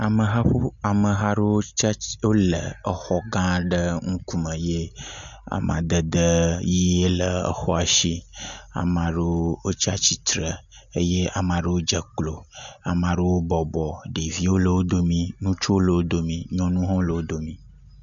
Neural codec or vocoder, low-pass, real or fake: none; 7.2 kHz; real